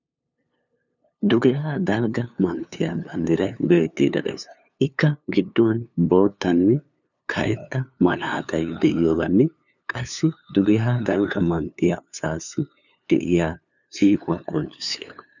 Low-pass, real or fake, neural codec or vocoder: 7.2 kHz; fake; codec, 16 kHz, 2 kbps, FunCodec, trained on LibriTTS, 25 frames a second